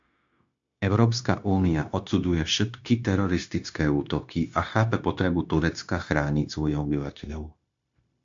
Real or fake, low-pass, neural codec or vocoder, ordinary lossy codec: fake; 7.2 kHz; codec, 16 kHz, 0.9 kbps, LongCat-Audio-Codec; AAC, 64 kbps